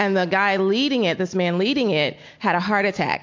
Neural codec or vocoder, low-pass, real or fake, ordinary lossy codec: none; 7.2 kHz; real; MP3, 48 kbps